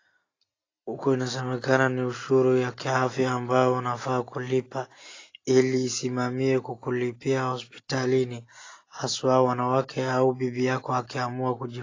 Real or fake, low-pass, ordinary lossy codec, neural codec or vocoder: real; 7.2 kHz; AAC, 32 kbps; none